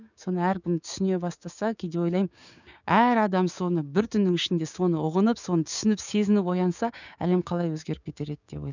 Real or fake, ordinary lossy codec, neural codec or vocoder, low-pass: fake; none; codec, 16 kHz, 6 kbps, DAC; 7.2 kHz